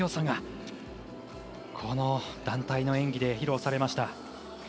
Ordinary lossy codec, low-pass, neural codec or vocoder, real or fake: none; none; none; real